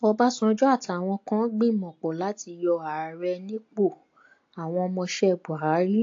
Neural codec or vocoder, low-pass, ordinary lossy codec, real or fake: none; 7.2 kHz; AAC, 48 kbps; real